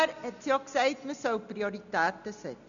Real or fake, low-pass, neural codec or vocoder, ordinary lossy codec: real; 7.2 kHz; none; none